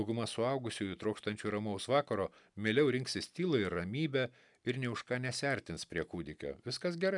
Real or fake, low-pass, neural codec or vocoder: real; 10.8 kHz; none